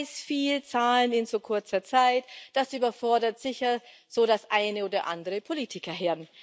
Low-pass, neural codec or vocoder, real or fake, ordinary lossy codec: none; none; real; none